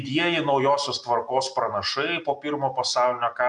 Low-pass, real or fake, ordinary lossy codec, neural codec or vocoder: 10.8 kHz; real; MP3, 96 kbps; none